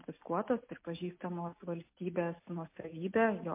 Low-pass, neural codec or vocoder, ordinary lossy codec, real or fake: 3.6 kHz; none; MP3, 24 kbps; real